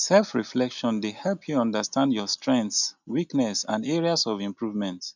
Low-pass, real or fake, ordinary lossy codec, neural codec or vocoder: 7.2 kHz; real; none; none